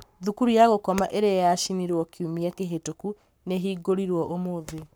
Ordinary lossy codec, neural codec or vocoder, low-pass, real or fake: none; codec, 44.1 kHz, 7.8 kbps, Pupu-Codec; none; fake